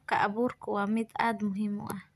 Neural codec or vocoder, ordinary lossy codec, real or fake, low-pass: none; none; real; 14.4 kHz